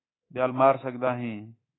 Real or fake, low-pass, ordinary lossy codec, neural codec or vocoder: fake; 7.2 kHz; AAC, 16 kbps; codec, 24 kHz, 3.1 kbps, DualCodec